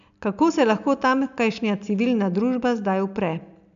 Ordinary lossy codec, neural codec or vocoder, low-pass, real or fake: none; none; 7.2 kHz; real